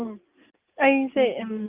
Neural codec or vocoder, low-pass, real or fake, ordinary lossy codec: none; 3.6 kHz; real; Opus, 24 kbps